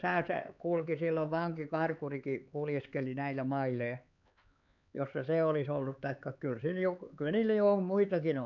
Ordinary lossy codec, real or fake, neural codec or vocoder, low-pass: none; fake; codec, 16 kHz, 4 kbps, X-Codec, HuBERT features, trained on LibriSpeech; 7.2 kHz